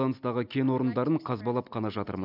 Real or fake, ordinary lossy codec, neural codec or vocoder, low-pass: real; none; none; 5.4 kHz